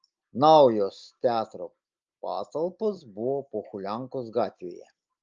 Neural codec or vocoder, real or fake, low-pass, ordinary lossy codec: none; real; 7.2 kHz; Opus, 24 kbps